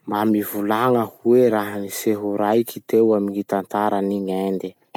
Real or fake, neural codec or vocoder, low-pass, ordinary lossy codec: real; none; 19.8 kHz; none